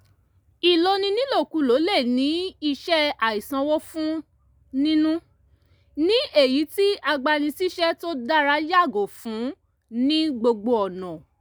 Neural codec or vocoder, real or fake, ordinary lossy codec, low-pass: none; real; none; none